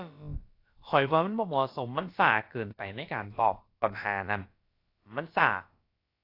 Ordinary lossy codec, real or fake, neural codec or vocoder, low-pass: AAC, 32 kbps; fake; codec, 16 kHz, about 1 kbps, DyCAST, with the encoder's durations; 5.4 kHz